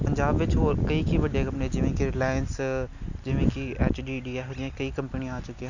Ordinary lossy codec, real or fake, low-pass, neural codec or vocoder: none; real; 7.2 kHz; none